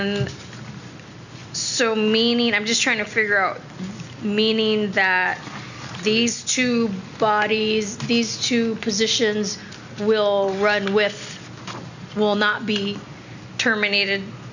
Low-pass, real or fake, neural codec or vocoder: 7.2 kHz; real; none